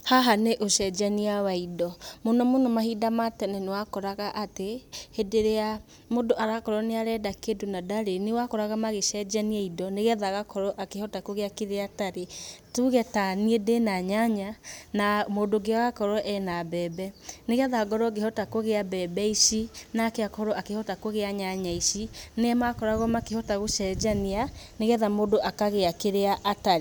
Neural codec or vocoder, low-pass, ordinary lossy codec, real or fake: none; none; none; real